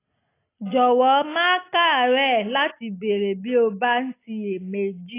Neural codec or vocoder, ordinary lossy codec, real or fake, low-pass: none; AAC, 24 kbps; real; 3.6 kHz